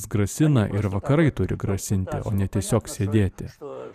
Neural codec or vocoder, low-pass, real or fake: vocoder, 44.1 kHz, 128 mel bands every 512 samples, BigVGAN v2; 14.4 kHz; fake